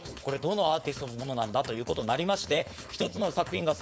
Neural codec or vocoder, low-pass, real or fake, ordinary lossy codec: codec, 16 kHz, 4.8 kbps, FACodec; none; fake; none